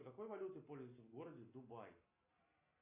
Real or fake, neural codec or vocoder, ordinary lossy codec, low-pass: real; none; MP3, 32 kbps; 3.6 kHz